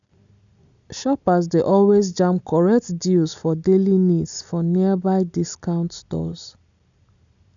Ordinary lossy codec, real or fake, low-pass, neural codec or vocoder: none; real; 7.2 kHz; none